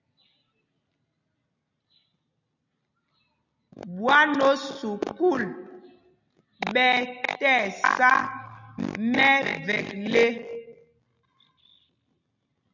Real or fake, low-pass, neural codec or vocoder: real; 7.2 kHz; none